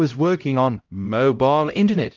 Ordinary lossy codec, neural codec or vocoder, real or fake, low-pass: Opus, 32 kbps; codec, 16 kHz, 0.5 kbps, X-Codec, HuBERT features, trained on LibriSpeech; fake; 7.2 kHz